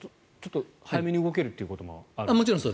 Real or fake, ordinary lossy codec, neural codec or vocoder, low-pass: real; none; none; none